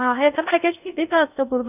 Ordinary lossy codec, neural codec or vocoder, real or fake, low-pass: none; codec, 16 kHz in and 24 kHz out, 0.6 kbps, FocalCodec, streaming, 2048 codes; fake; 3.6 kHz